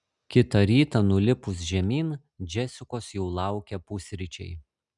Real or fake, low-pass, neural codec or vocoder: real; 10.8 kHz; none